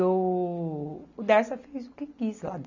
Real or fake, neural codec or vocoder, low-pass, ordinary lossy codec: real; none; 7.2 kHz; none